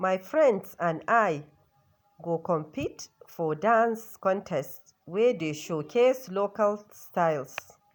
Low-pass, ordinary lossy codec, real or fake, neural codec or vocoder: none; none; real; none